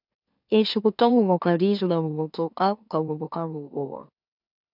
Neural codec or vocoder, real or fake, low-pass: autoencoder, 44.1 kHz, a latent of 192 numbers a frame, MeloTTS; fake; 5.4 kHz